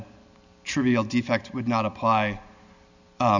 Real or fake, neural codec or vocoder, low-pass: real; none; 7.2 kHz